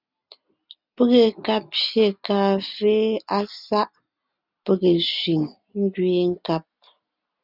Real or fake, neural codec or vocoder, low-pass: real; none; 5.4 kHz